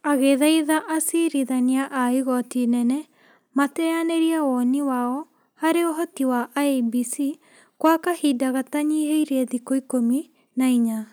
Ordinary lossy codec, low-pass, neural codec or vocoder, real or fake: none; none; none; real